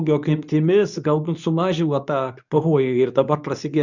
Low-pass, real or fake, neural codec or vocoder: 7.2 kHz; fake; codec, 24 kHz, 0.9 kbps, WavTokenizer, medium speech release version 2